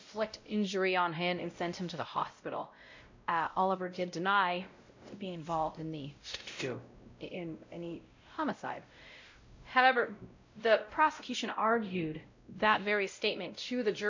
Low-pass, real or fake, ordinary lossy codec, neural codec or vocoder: 7.2 kHz; fake; MP3, 64 kbps; codec, 16 kHz, 0.5 kbps, X-Codec, WavLM features, trained on Multilingual LibriSpeech